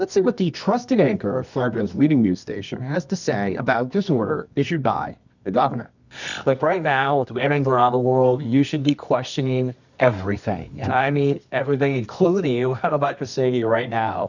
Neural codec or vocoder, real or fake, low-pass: codec, 24 kHz, 0.9 kbps, WavTokenizer, medium music audio release; fake; 7.2 kHz